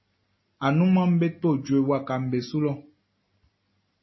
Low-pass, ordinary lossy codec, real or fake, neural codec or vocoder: 7.2 kHz; MP3, 24 kbps; real; none